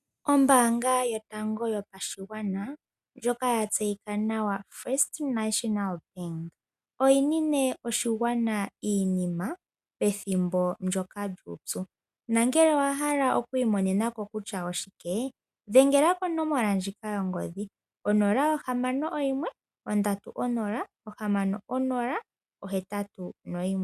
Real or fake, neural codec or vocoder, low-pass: real; none; 14.4 kHz